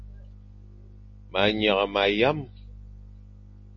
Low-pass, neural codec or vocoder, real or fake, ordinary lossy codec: 7.2 kHz; none; real; MP3, 32 kbps